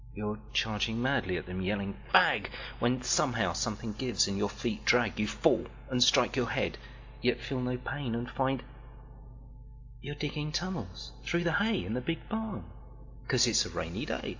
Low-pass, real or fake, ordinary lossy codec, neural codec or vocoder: 7.2 kHz; real; AAC, 48 kbps; none